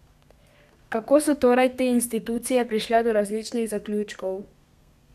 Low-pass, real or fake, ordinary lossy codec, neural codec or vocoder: 14.4 kHz; fake; none; codec, 32 kHz, 1.9 kbps, SNAC